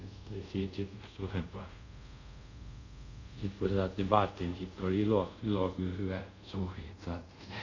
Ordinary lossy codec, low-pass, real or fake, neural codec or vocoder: none; 7.2 kHz; fake; codec, 24 kHz, 0.5 kbps, DualCodec